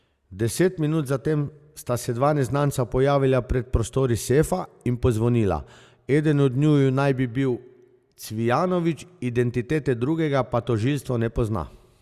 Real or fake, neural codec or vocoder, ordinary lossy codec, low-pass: real; none; Opus, 64 kbps; 14.4 kHz